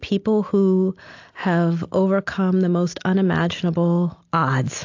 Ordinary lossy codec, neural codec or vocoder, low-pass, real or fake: AAC, 48 kbps; none; 7.2 kHz; real